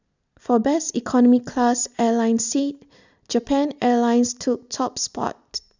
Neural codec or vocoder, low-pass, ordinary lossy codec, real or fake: none; 7.2 kHz; none; real